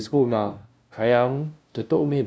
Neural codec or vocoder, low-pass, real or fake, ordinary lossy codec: codec, 16 kHz, 0.5 kbps, FunCodec, trained on LibriTTS, 25 frames a second; none; fake; none